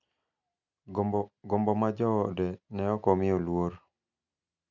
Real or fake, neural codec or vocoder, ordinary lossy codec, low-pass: real; none; none; 7.2 kHz